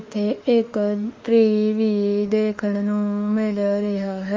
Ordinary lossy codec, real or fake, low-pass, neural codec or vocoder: Opus, 32 kbps; fake; 7.2 kHz; autoencoder, 48 kHz, 32 numbers a frame, DAC-VAE, trained on Japanese speech